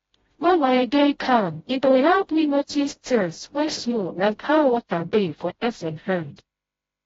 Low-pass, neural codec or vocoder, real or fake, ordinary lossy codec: 7.2 kHz; codec, 16 kHz, 0.5 kbps, FreqCodec, smaller model; fake; AAC, 24 kbps